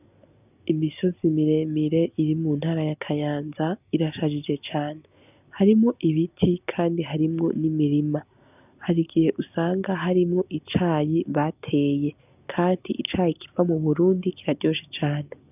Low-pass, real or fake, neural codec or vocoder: 3.6 kHz; real; none